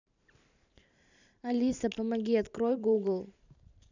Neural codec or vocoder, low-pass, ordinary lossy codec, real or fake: vocoder, 44.1 kHz, 128 mel bands every 512 samples, BigVGAN v2; 7.2 kHz; none; fake